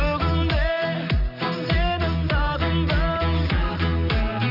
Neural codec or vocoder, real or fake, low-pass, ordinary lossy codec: none; real; 5.4 kHz; none